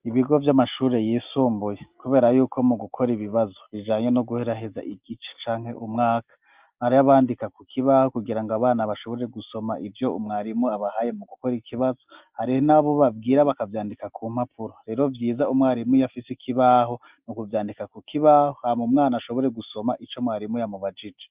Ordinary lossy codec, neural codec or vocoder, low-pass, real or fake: Opus, 24 kbps; none; 3.6 kHz; real